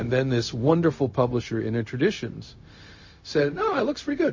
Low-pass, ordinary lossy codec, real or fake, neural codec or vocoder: 7.2 kHz; MP3, 32 kbps; fake; codec, 16 kHz, 0.4 kbps, LongCat-Audio-Codec